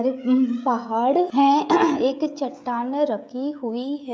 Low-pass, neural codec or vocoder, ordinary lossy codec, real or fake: none; codec, 16 kHz, 16 kbps, FreqCodec, smaller model; none; fake